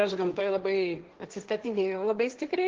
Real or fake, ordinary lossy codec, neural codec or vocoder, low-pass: fake; Opus, 16 kbps; codec, 16 kHz, 1.1 kbps, Voila-Tokenizer; 7.2 kHz